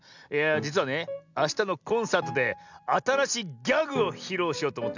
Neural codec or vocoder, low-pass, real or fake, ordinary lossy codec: none; 7.2 kHz; real; none